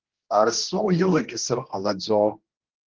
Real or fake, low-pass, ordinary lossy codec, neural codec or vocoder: fake; 7.2 kHz; Opus, 16 kbps; codec, 16 kHz, 1 kbps, X-Codec, HuBERT features, trained on general audio